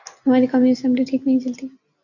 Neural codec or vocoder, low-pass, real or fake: none; 7.2 kHz; real